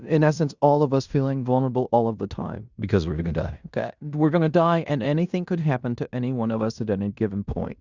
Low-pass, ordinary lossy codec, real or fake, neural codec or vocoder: 7.2 kHz; Opus, 64 kbps; fake; codec, 16 kHz in and 24 kHz out, 0.9 kbps, LongCat-Audio-Codec, fine tuned four codebook decoder